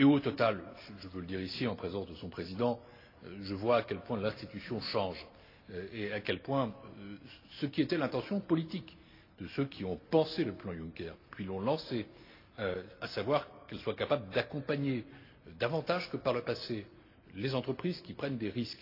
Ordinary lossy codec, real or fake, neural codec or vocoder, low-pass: AAC, 32 kbps; real; none; 5.4 kHz